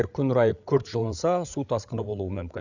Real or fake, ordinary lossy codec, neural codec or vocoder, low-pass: fake; none; codec, 16 kHz, 8 kbps, FreqCodec, larger model; 7.2 kHz